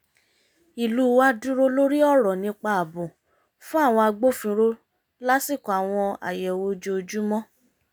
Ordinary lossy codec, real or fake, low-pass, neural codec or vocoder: none; real; none; none